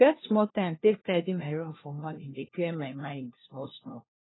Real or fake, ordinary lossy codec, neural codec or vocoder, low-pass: fake; AAC, 16 kbps; codec, 16 kHz, 1 kbps, FunCodec, trained on LibriTTS, 50 frames a second; 7.2 kHz